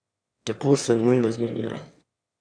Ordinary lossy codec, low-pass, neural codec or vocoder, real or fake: none; 9.9 kHz; autoencoder, 22.05 kHz, a latent of 192 numbers a frame, VITS, trained on one speaker; fake